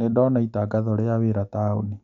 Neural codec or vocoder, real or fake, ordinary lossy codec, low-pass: none; real; none; 7.2 kHz